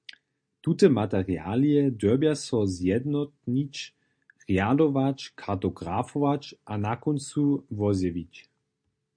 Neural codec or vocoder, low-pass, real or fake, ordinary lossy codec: none; 9.9 kHz; real; MP3, 48 kbps